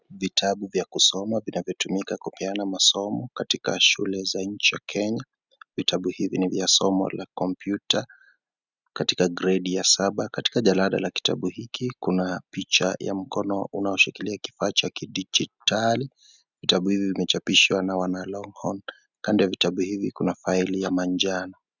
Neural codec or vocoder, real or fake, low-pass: none; real; 7.2 kHz